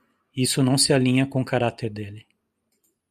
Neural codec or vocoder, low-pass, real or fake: none; 14.4 kHz; real